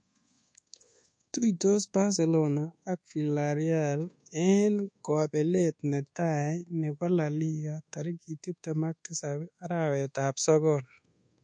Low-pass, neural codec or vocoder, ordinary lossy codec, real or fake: 9.9 kHz; codec, 24 kHz, 1.2 kbps, DualCodec; MP3, 48 kbps; fake